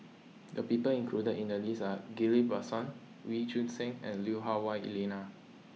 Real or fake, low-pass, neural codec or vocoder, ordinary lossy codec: real; none; none; none